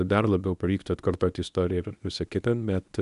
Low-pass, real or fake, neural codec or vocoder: 10.8 kHz; fake; codec, 24 kHz, 0.9 kbps, WavTokenizer, medium speech release version 1